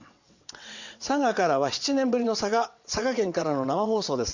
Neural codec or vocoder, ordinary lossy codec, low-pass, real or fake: vocoder, 22.05 kHz, 80 mel bands, WaveNeXt; Opus, 64 kbps; 7.2 kHz; fake